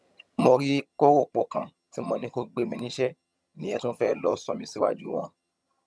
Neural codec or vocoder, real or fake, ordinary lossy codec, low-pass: vocoder, 22.05 kHz, 80 mel bands, HiFi-GAN; fake; none; none